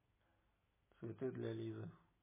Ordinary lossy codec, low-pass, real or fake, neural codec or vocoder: MP3, 16 kbps; 3.6 kHz; real; none